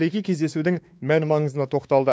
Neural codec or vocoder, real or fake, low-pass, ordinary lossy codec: codec, 16 kHz, 2 kbps, X-Codec, WavLM features, trained on Multilingual LibriSpeech; fake; none; none